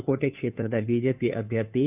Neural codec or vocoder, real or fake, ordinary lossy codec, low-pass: codec, 16 kHz, 2 kbps, FunCodec, trained on Chinese and English, 25 frames a second; fake; none; 3.6 kHz